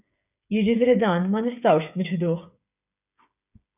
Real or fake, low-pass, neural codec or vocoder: fake; 3.6 kHz; codec, 16 kHz, 8 kbps, FreqCodec, smaller model